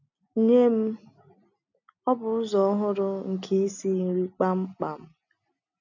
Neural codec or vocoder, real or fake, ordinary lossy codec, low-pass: none; real; none; 7.2 kHz